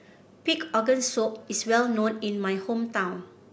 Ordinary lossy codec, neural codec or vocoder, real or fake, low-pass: none; none; real; none